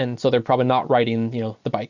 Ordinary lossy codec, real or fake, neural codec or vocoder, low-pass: Opus, 64 kbps; real; none; 7.2 kHz